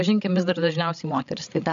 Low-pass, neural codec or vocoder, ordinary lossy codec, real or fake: 7.2 kHz; codec, 16 kHz, 16 kbps, FreqCodec, larger model; AAC, 96 kbps; fake